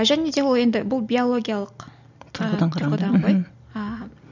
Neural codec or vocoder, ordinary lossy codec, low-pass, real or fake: none; none; 7.2 kHz; real